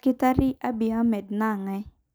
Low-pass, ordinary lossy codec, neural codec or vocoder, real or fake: none; none; none; real